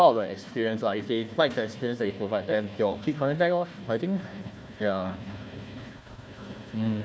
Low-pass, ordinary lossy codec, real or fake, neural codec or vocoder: none; none; fake; codec, 16 kHz, 1 kbps, FunCodec, trained on Chinese and English, 50 frames a second